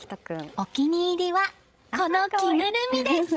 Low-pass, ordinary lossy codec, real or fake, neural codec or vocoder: none; none; fake; codec, 16 kHz, 16 kbps, FreqCodec, larger model